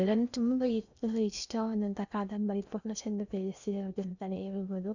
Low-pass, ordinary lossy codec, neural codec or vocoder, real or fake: 7.2 kHz; none; codec, 16 kHz in and 24 kHz out, 0.8 kbps, FocalCodec, streaming, 65536 codes; fake